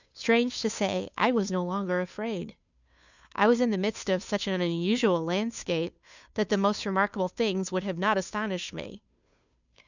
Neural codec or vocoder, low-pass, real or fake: codec, 16 kHz, 2 kbps, FunCodec, trained on Chinese and English, 25 frames a second; 7.2 kHz; fake